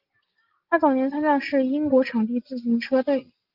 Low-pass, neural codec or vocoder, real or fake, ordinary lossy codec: 5.4 kHz; none; real; Opus, 32 kbps